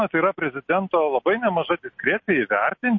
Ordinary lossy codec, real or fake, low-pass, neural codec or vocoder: MP3, 32 kbps; real; 7.2 kHz; none